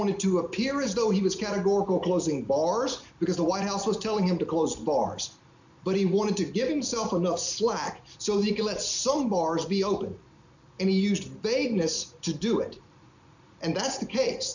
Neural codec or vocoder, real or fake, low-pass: none; real; 7.2 kHz